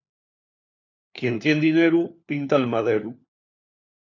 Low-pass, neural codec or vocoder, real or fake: 7.2 kHz; codec, 16 kHz, 4 kbps, FunCodec, trained on LibriTTS, 50 frames a second; fake